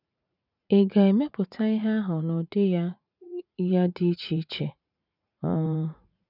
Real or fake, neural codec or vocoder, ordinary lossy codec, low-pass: fake; vocoder, 44.1 kHz, 80 mel bands, Vocos; none; 5.4 kHz